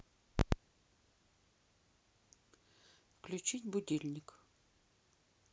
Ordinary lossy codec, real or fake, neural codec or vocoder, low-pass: none; real; none; none